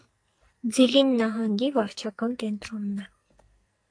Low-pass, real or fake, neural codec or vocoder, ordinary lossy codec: 9.9 kHz; fake; codec, 44.1 kHz, 2.6 kbps, SNAC; AAC, 64 kbps